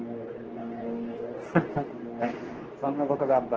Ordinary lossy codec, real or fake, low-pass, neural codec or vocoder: Opus, 16 kbps; fake; 7.2 kHz; codec, 24 kHz, 0.9 kbps, WavTokenizer, medium speech release version 2